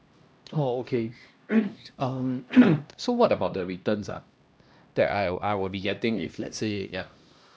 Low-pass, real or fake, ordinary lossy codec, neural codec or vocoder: none; fake; none; codec, 16 kHz, 1 kbps, X-Codec, HuBERT features, trained on LibriSpeech